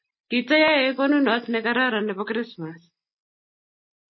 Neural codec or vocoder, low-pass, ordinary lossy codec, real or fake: none; 7.2 kHz; MP3, 24 kbps; real